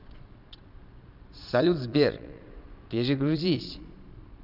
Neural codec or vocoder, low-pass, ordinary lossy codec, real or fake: vocoder, 22.05 kHz, 80 mel bands, Vocos; 5.4 kHz; none; fake